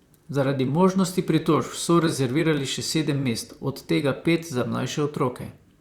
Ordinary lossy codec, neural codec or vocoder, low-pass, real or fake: Opus, 64 kbps; vocoder, 44.1 kHz, 128 mel bands, Pupu-Vocoder; 19.8 kHz; fake